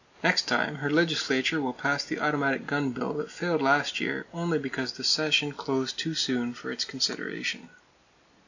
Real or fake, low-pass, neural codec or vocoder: real; 7.2 kHz; none